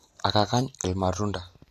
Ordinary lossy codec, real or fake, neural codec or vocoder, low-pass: AAC, 48 kbps; real; none; 14.4 kHz